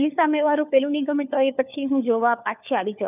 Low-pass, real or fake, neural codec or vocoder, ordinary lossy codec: 3.6 kHz; fake; codec, 16 kHz, 4 kbps, FunCodec, trained on LibriTTS, 50 frames a second; none